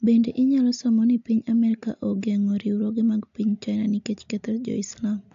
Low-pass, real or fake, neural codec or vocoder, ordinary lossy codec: 7.2 kHz; real; none; AAC, 64 kbps